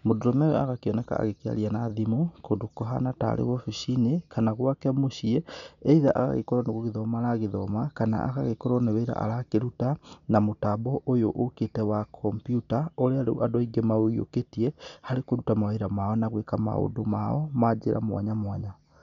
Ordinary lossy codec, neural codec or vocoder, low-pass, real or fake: MP3, 96 kbps; none; 7.2 kHz; real